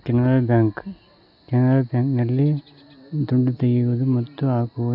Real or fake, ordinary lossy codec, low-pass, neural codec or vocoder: real; AAC, 48 kbps; 5.4 kHz; none